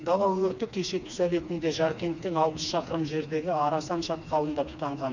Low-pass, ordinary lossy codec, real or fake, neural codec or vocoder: 7.2 kHz; none; fake; codec, 16 kHz, 2 kbps, FreqCodec, smaller model